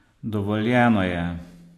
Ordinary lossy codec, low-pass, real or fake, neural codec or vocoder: AAC, 64 kbps; 14.4 kHz; real; none